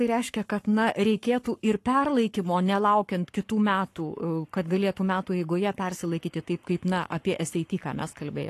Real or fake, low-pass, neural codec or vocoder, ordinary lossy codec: fake; 14.4 kHz; codec, 44.1 kHz, 7.8 kbps, Pupu-Codec; AAC, 48 kbps